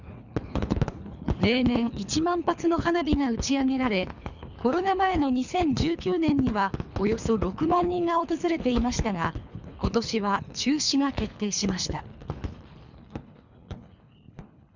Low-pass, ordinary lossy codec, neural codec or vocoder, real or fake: 7.2 kHz; none; codec, 24 kHz, 3 kbps, HILCodec; fake